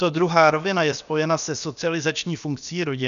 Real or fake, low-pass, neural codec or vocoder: fake; 7.2 kHz; codec, 16 kHz, about 1 kbps, DyCAST, with the encoder's durations